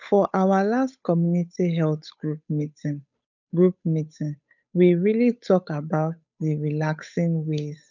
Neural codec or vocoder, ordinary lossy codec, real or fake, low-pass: codec, 16 kHz, 8 kbps, FunCodec, trained on Chinese and English, 25 frames a second; none; fake; 7.2 kHz